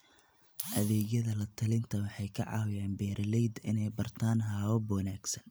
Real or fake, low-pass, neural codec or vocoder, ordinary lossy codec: real; none; none; none